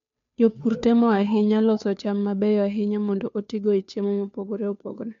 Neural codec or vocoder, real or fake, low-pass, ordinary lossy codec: codec, 16 kHz, 2 kbps, FunCodec, trained on Chinese and English, 25 frames a second; fake; 7.2 kHz; MP3, 64 kbps